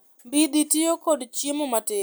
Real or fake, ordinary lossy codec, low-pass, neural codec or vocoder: real; none; none; none